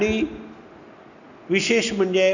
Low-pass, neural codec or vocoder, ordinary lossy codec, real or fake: 7.2 kHz; none; none; real